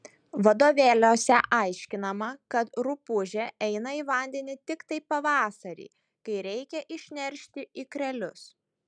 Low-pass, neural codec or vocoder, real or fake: 9.9 kHz; none; real